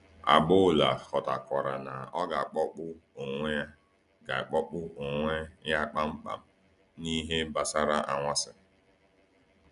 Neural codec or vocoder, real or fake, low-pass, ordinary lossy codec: none; real; 10.8 kHz; none